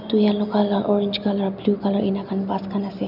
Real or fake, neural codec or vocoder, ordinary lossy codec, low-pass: real; none; none; 5.4 kHz